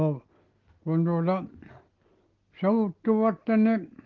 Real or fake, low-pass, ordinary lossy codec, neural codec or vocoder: real; 7.2 kHz; Opus, 16 kbps; none